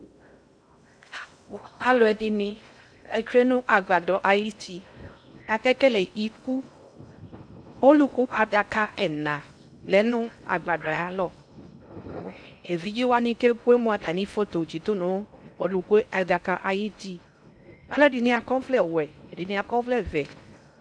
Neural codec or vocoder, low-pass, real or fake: codec, 16 kHz in and 24 kHz out, 0.6 kbps, FocalCodec, streaming, 2048 codes; 9.9 kHz; fake